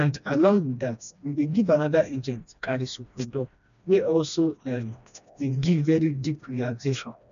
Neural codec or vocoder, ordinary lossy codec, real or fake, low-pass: codec, 16 kHz, 1 kbps, FreqCodec, smaller model; none; fake; 7.2 kHz